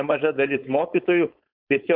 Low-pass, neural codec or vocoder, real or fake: 5.4 kHz; codec, 16 kHz, 4.8 kbps, FACodec; fake